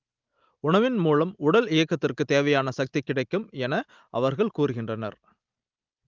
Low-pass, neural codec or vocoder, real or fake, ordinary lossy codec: 7.2 kHz; none; real; Opus, 24 kbps